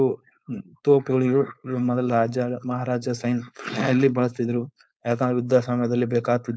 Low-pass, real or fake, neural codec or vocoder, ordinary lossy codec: none; fake; codec, 16 kHz, 4.8 kbps, FACodec; none